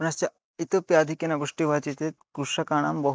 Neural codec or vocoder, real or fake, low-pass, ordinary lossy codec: none; real; none; none